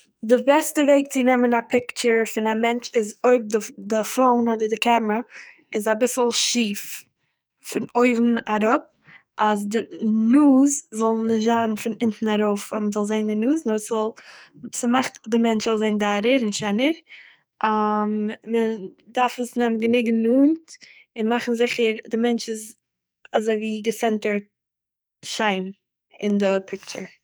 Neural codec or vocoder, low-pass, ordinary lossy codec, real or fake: codec, 44.1 kHz, 2.6 kbps, SNAC; none; none; fake